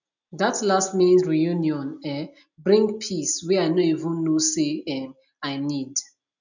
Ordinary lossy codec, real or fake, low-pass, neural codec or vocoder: none; real; 7.2 kHz; none